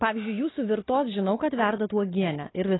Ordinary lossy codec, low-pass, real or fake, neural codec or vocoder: AAC, 16 kbps; 7.2 kHz; real; none